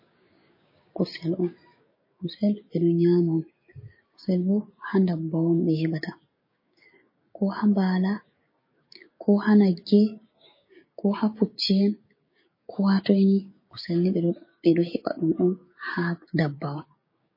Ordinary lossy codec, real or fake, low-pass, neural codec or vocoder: MP3, 24 kbps; fake; 5.4 kHz; codec, 16 kHz, 6 kbps, DAC